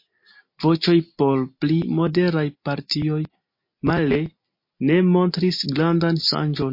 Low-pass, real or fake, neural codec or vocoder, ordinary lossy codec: 5.4 kHz; real; none; MP3, 32 kbps